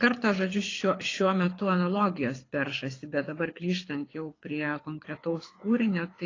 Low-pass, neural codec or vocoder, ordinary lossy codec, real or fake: 7.2 kHz; codec, 24 kHz, 6 kbps, HILCodec; AAC, 32 kbps; fake